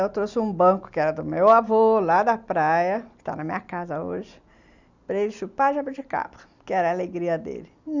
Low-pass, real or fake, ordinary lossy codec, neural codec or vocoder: 7.2 kHz; real; Opus, 64 kbps; none